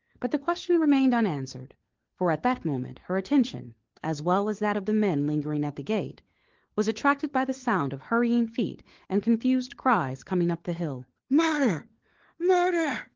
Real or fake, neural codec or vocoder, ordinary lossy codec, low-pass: fake; codec, 16 kHz, 4 kbps, FunCodec, trained on LibriTTS, 50 frames a second; Opus, 16 kbps; 7.2 kHz